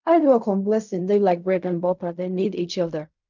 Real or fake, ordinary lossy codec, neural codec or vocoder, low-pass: fake; none; codec, 16 kHz in and 24 kHz out, 0.4 kbps, LongCat-Audio-Codec, fine tuned four codebook decoder; 7.2 kHz